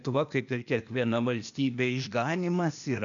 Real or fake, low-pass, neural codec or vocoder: fake; 7.2 kHz; codec, 16 kHz, 0.8 kbps, ZipCodec